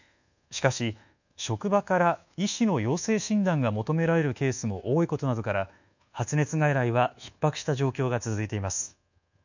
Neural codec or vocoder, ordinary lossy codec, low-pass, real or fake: codec, 24 kHz, 1.2 kbps, DualCodec; none; 7.2 kHz; fake